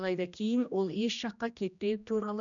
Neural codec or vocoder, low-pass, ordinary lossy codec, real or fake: codec, 16 kHz, 1 kbps, X-Codec, HuBERT features, trained on general audio; 7.2 kHz; none; fake